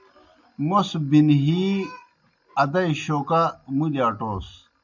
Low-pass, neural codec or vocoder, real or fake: 7.2 kHz; none; real